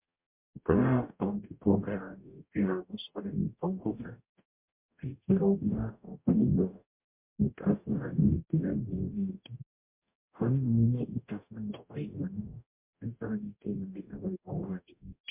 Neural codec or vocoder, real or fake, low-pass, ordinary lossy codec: codec, 44.1 kHz, 0.9 kbps, DAC; fake; 3.6 kHz; MP3, 32 kbps